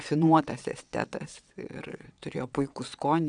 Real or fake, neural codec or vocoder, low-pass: fake; vocoder, 22.05 kHz, 80 mel bands, WaveNeXt; 9.9 kHz